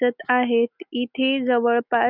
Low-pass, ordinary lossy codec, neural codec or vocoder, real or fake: 5.4 kHz; none; none; real